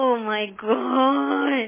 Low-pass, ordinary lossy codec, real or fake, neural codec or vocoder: 3.6 kHz; MP3, 16 kbps; real; none